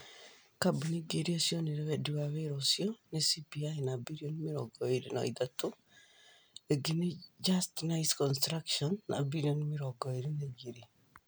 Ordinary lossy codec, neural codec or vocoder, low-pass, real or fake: none; none; none; real